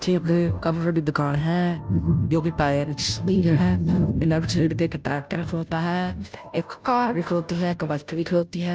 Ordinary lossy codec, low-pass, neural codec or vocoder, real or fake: none; none; codec, 16 kHz, 0.5 kbps, FunCodec, trained on Chinese and English, 25 frames a second; fake